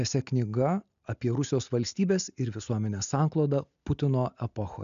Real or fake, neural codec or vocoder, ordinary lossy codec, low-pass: real; none; AAC, 96 kbps; 7.2 kHz